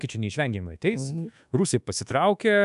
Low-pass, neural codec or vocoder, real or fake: 10.8 kHz; codec, 24 kHz, 1.2 kbps, DualCodec; fake